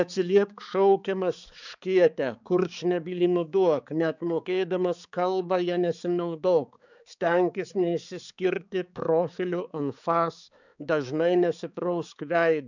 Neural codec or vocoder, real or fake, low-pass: codec, 16 kHz, 4 kbps, X-Codec, HuBERT features, trained on balanced general audio; fake; 7.2 kHz